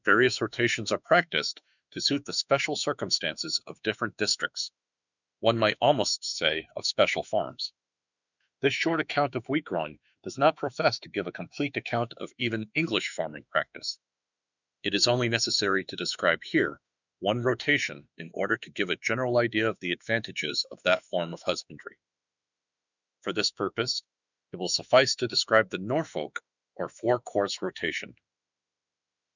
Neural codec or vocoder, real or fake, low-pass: autoencoder, 48 kHz, 32 numbers a frame, DAC-VAE, trained on Japanese speech; fake; 7.2 kHz